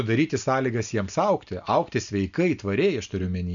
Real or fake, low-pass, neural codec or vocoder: real; 7.2 kHz; none